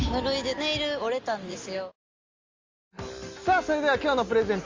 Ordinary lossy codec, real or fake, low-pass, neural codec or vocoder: Opus, 32 kbps; real; 7.2 kHz; none